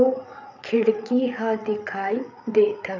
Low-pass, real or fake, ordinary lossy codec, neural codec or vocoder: 7.2 kHz; fake; none; codec, 16 kHz, 8 kbps, FreqCodec, larger model